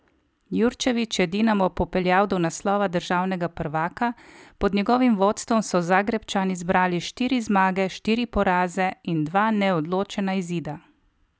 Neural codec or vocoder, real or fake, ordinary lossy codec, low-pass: none; real; none; none